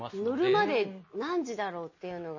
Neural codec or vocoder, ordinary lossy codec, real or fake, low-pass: none; MP3, 32 kbps; real; 7.2 kHz